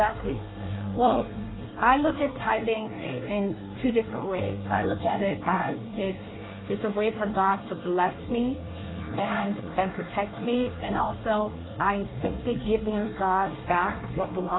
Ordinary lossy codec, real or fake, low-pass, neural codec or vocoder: AAC, 16 kbps; fake; 7.2 kHz; codec, 24 kHz, 1 kbps, SNAC